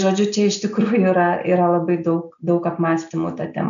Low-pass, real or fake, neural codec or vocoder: 7.2 kHz; real; none